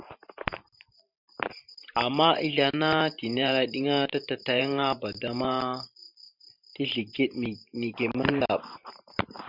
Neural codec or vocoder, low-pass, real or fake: vocoder, 44.1 kHz, 128 mel bands every 512 samples, BigVGAN v2; 5.4 kHz; fake